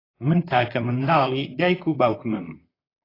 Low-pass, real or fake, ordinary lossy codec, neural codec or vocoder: 5.4 kHz; fake; AAC, 24 kbps; vocoder, 44.1 kHz, 128 mel bands, Pupu-Vocoder